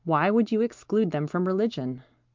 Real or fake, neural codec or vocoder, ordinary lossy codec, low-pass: real; none; Opus, 24 kbps; 7.2 kHz